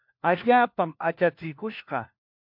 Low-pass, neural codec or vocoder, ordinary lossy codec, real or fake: 5.4 kHz; codec, 16 kHz, 1 kbps, FunCodec, trained on LibriTTS, 50 frames a second; MP3, 48 kbps; fake